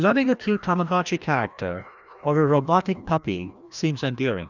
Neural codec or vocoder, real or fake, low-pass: codec, 16 kHz, 1 kbps, FreqCodec, larger model; fake; 7.2 kHz